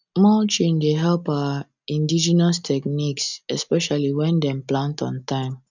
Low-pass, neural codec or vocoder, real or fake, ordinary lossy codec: 7.2 kHz; none; real; none